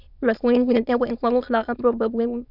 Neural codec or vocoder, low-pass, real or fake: autoencoder, 22.05 kHz, a latent of 192 numbers a frame, VITS, trained on many speakers; 5.4 kHz; fake